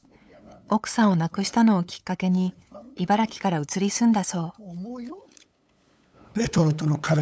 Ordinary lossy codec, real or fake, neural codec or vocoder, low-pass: none; fake; codec, 16 kHz, 8 kbps, FunCodec, trained on LibriTTS, 25 frames a second; none